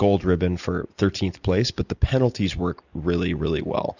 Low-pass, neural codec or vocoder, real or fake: 7.2 kHz; none; real